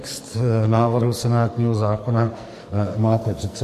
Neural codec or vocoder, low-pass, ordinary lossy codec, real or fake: codec, 44.1 kHz, 2.6 kbps, SNAC; 14.4 kHz; MP3, 64 kbps; fake